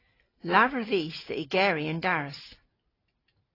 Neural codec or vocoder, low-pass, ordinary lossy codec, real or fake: none; 5.4 kHz; AAC, 24 kbps; real